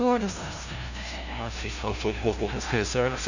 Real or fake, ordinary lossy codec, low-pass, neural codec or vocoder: fake; none; 7.2 kHz; codec, 16 kHz, 0.5 kbps, FunCodec, trained on LibriTTS, 25 frames a second